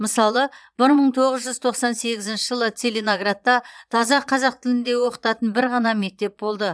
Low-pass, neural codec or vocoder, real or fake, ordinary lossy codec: none; vocoder, 22.05 kHz, 80 mel bands, Vocos; fake; none